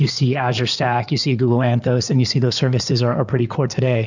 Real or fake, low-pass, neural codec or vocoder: fake; 7.2 kHz; codec, 16 kHz in and 24 kHz out, 2.2 kbps, FireRedTTS-2 codec